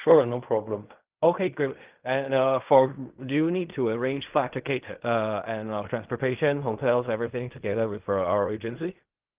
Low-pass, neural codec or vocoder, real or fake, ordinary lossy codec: 3.6 kHz; codec, 16 kHz in and 24 kHz out, 0.4 kbps, LongCat-Audio-Codec, fine tuned four codebook decoder; fake; Opus, 32 kbps